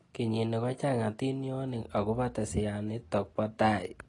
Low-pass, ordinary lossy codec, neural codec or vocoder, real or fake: 10.8 kHz; AAC, 32 kbps; none; real